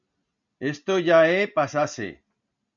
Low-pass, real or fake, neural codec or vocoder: 7.2 kHz; real; none